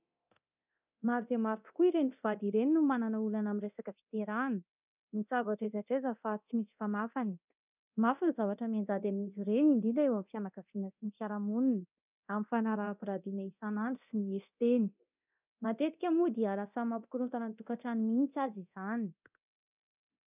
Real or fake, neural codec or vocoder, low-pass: fake; codec, 24 kHz, 0.9 kbps, DualCodec; 3.6 kHz